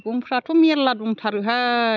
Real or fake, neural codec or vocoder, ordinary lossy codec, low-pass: real; none; none; 7.2 kHz